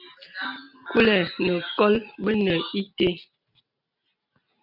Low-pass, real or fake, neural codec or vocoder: 5.4 kHz; real; none